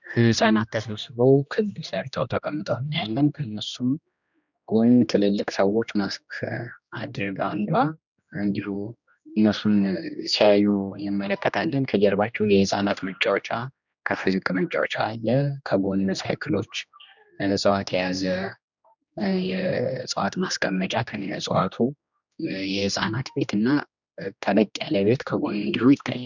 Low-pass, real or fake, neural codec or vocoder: 7.2 kHz; fake; codec, 16 kHz, 1 kbps, X-Codec, HuBERT features, trained on general audio